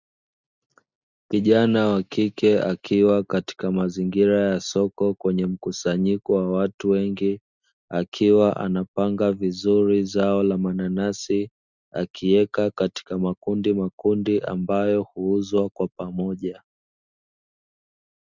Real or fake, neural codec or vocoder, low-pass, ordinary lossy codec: real; none; 7.2 kHz; Opus, 64 kbps